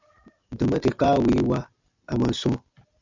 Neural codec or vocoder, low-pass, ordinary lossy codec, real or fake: none; 7.2 kHz; MP3, 64 kbps; real